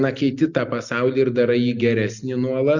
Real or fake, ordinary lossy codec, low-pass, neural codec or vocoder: real; Opus, 64 kbps; 7.2 kHz; none